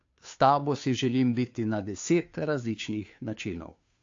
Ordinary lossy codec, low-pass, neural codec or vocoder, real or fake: AAC, 48 kbps; 7.2 kHz; codec, 16 kHz, 2 kbps, FunCodec, trained on Chinese and English, 25 frames a second; fake